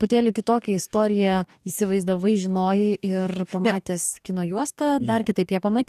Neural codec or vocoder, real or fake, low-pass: codec, 44.1 kHz, 2.6 kbps, DAC; fake; 14.4 kHz